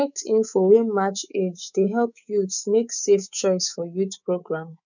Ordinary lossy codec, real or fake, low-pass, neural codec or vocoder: none; fake; 7.2 kHz; codec, 24 kHz, 3.1 kbps, DualCodec